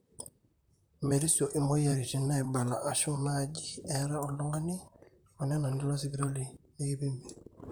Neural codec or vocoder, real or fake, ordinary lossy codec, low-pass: vocoder, 44.1 kHz, 128 mel bands, Pupu-Vocoder; fake; none; none